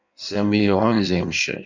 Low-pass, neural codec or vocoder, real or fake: 7.2 kHz; codec, 16 kHz in and 24 kHz out, 1.1 kbps, FireRedTTS-2 codec; fake